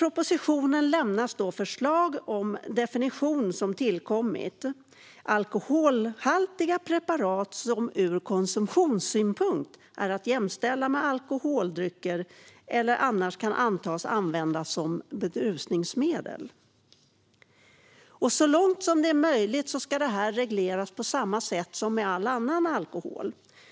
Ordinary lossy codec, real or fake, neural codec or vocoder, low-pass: none; real; none; none